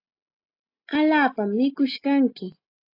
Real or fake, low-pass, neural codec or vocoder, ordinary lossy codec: real; 5.4 kHz; none; AAC, 32 kbps